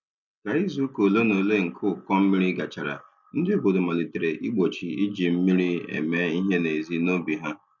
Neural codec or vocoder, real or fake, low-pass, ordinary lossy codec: none; real; 7.2 kHz; none